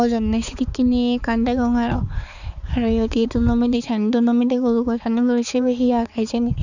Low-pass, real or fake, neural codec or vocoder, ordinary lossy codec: 7.2 kHz; fake; codec, 16 kHz, 4 kbps, X-Codec, HuBERT features, trained on balanced general audio; none